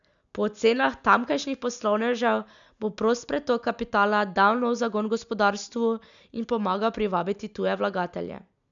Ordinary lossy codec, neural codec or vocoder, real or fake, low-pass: MP3, 96 kbps; none; real; 7.2 kHz